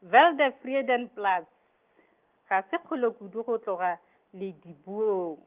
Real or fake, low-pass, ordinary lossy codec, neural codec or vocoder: real; 3.6 kHz; Opus, 32 kbps; none